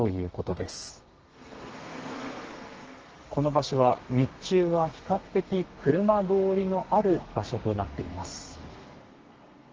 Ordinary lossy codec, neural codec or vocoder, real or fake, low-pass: Opus, 16 kbps; codec, 32 kHz, 1.9 kbps, SNAC; fake; 7.2 kHz